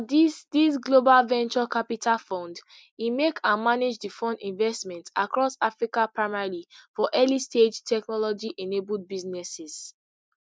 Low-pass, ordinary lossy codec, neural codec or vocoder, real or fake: none; none; none; real